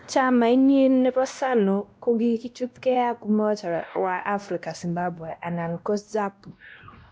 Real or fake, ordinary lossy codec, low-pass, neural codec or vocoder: fake; none; none; codec, 16 kHz, 1 kbps, X-Codec, WavLM features, trained on Multilingual LibriSpeech